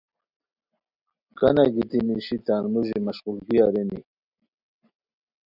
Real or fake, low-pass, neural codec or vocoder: real; 5.4 kHz; none